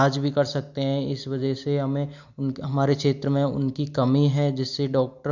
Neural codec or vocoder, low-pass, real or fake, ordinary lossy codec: none; 7.2 kHz; real; none